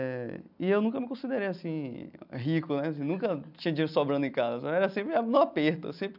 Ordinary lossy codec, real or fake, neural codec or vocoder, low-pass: none; real; none; 5.4 kHz